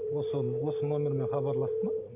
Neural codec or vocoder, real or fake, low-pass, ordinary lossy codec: vocoder, 44.1 kHz, 128 mel bands every 512 samples, BigVGAN v2; fake; 3.6 kHz; none